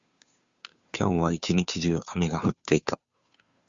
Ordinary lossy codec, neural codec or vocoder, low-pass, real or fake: Opus, 64 kbps; codec, 16 kHz, 2 kbps, FunCodec, trained on Chinese and English, 25 frames a second; 7.2 kHz; fake